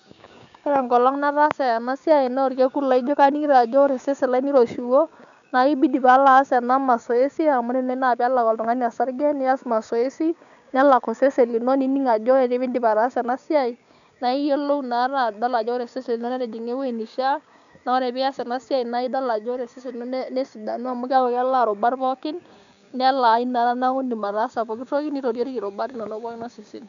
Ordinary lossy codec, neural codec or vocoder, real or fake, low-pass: none; codec, 16 kHz, 6 kbps, DAC; fake; 7.2 kHz